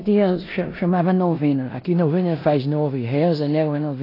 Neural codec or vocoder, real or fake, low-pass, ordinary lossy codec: codec, 16 kHz in and 24 kHz out, 0.9 kbps, LongCat-Audio-Codec, four codebook decoder; fake; 5.4 kHz; AAC, 24 kbps